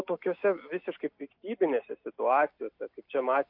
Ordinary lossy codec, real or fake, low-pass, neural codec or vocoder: MP3, 48 kbps; real; 5.4 kHz; none